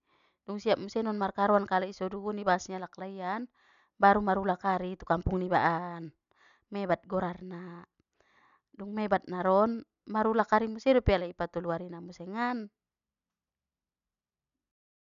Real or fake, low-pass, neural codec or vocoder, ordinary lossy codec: real; 7.2 kHz; none; none